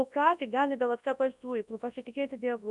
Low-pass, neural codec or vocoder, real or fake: 10.8 kHz; codec, 24 kHz, 0.9 kbps, WavTokenizer, large speech release; fake